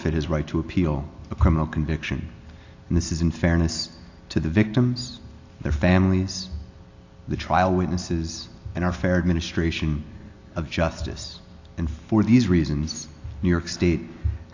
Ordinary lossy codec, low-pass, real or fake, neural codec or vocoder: AAC, 48 kbps; 7.2 kHz; real; none